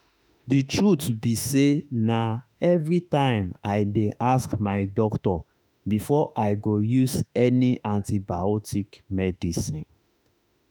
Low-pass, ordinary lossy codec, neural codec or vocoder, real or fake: none; none; autoencoder, 48 kHz, 32 numbers a frame, DAC-VAE, trained on Japanese speech; fake